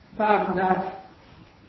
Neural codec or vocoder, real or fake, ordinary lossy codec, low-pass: codec, 24 kHz, 0.9 kbps, WavTokenizer, medium speech release version 1; fake; MP3, 24 kbps; 7.2 kHz